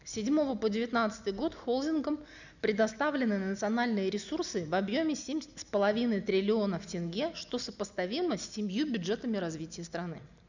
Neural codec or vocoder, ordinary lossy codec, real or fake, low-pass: none; none; real; 7.2 kHz